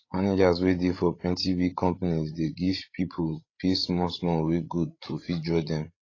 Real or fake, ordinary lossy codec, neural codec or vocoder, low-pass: fake; AAC, 32 kbps; codec, 16 kHz, 16 kbps, FreqCodec, larger model; 7.2 kHz